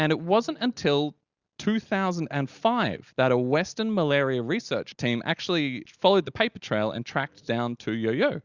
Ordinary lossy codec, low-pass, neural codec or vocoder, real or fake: Opus, 64 kbps; 7.2 kHz; none; real